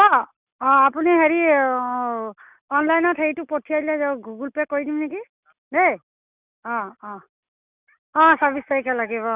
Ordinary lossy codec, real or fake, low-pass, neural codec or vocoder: none; real; 3.6 kHz; none